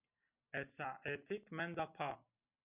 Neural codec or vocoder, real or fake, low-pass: none; real; 3.6 kHz